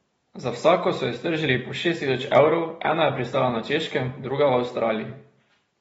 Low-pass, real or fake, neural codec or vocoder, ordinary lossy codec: 19.8 kHz; real; none; AAC, 24 kbps